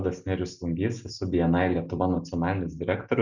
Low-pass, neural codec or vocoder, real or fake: 7.2 kHz; none; real